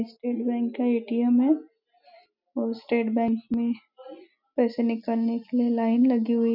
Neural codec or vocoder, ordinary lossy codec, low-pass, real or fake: none; none; 5.4 kHz; real